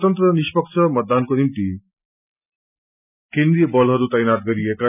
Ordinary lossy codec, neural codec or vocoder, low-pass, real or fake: none; none; 3.6 kHz; real